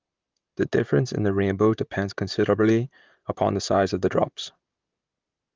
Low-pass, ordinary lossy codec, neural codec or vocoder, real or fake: 7.2 kHz; Opus, 32 kbps; vocoder, 44.1 kHz, 128 mel bands, Pupu-Vocoder; fake